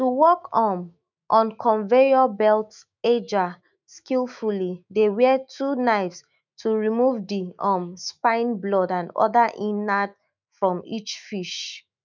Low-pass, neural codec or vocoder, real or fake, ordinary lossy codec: 7.2 kHz; autoencoder, 48 kHz, 128 numbers a frame, DAC-VAE, trained on Japanese speech; fake; none